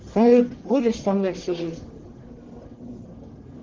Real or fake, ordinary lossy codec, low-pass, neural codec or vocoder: fake; Opus, 16 kbps; 7.2 kHz; codec, 44.1 kHz, 1.7 kbps, Pupu-Codec